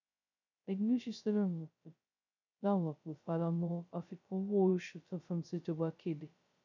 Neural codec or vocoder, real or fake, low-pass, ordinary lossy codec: codec, 16 kHz, 0.2 kbps, FocalCodec; fake; 7.2 kHz; none